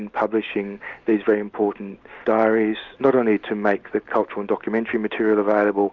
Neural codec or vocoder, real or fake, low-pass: none; real; 7.2 kHz